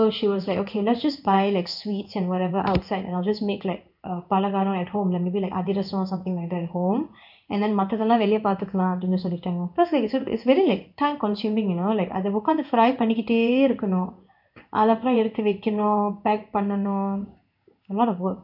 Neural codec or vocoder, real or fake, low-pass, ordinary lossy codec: codec, 16 kHz in and 24 kHz out, 1 kbps, XY-Tokenizer; fake; 5.4 kHz; none